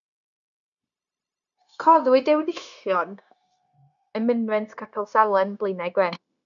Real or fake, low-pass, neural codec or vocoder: fake; 7.2 kHz; codec, 16 kHz, 0.9 kbps, LongCat-Audio-Codec